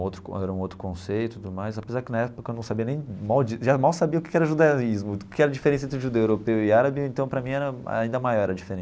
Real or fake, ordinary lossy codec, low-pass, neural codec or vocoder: real; none; none; none